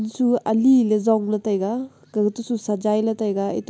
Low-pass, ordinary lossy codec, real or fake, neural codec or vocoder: none; none; real; none